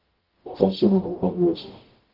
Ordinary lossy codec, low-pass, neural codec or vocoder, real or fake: Opus, 16 kbps; 5.4 kHz; codec, 44.1 kHz, 0.9 kbps, DAC; fake